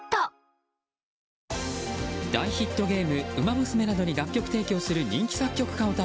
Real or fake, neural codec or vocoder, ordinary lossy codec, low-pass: real; none; none; none